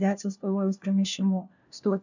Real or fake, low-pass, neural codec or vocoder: fake; 7.2 kHz; codec, 16 kHz, 1 kbps, FunCodec, trained on LibriTTS, 50 frames a second